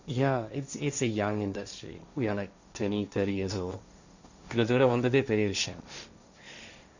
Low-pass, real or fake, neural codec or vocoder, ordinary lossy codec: 7.2 kHz; fake; codec, 16 kHz, 1.1 kbps, Voila-Tokenizer; none